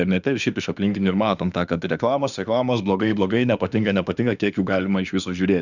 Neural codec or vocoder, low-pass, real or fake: autoencoder, 48 kHz, 32 numbers a frame, DAC-VAE, trained on Japanese speech; 7.2 kHz; fake